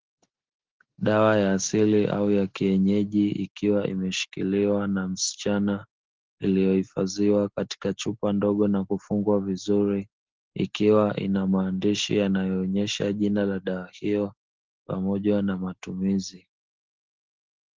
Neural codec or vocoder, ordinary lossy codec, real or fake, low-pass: none; Opus, 16 kbps; real; 7.2 kHz